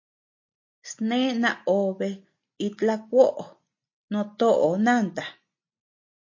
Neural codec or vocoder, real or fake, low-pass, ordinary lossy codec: none; real; 7.2 kHz; MP3, 32 kbps